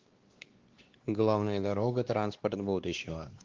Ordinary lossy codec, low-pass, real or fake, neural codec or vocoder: Opus, 16 kbps; 7.2 kHz; fake; codec, 16 kHz, 2 kbps, X-Codec, WavLM features, trained on Multilingual LibriSpeech